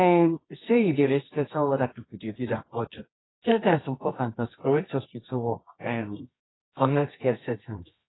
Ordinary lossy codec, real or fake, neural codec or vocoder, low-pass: AAC, 16 kbps; fake; codec, 24 kHz, 0.9 kbps, WavTokenizer, medium music audio release; 7.2 kHz